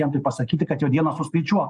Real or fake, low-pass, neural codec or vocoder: real; 10.8 kHz; none